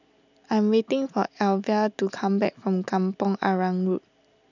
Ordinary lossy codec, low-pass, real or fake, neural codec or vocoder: none; 7.2 kHz; real; none